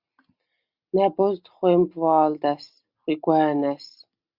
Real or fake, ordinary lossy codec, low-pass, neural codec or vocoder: real; Opus, 64 kbps; 5.4 kHz; none